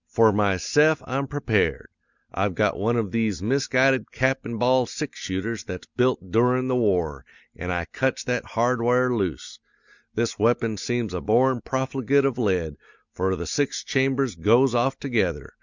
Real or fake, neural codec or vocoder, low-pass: real; none; 7.2 kHz